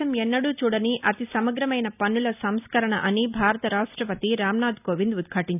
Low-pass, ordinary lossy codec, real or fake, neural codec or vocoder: 3.6 kHz; none; real; none